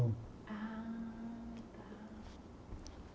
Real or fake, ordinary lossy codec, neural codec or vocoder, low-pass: real; none; none; none